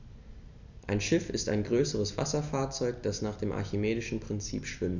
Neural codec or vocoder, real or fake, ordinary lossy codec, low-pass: none; real; none; 7.2 kHz